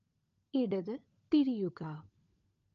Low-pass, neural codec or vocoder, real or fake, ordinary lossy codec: 7.2 kHz; codec, 16 kHz, 16 kbps, FunCodec, trained on LibriTTS, 50 frames a second; fake; Opus, 24 kbps